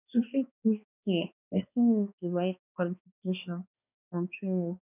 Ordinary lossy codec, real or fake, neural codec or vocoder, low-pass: none; fake; codec, 16 kHz, 2 kbps, X-Codec, HuBERT features, trained on balanced general audio; 3.6 kHz